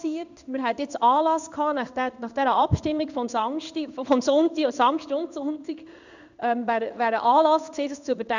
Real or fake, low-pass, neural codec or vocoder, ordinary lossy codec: fake; 7.2 kHz; codec, 16 kHz in and 24 kHz out, 1 kbps, XY-Tokenizer; none